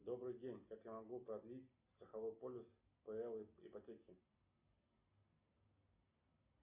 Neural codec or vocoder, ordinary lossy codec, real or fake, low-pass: none; Opus, 64 kbps; real; 3.6 kHz